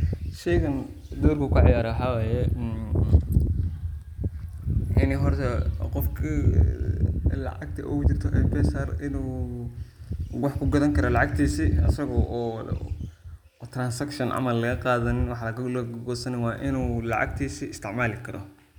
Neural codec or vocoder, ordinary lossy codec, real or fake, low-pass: none; none; real; 19.8 kHz